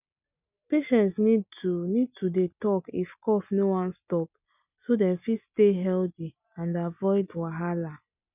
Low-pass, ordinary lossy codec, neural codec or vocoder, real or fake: 3.6 kHz; none; none; real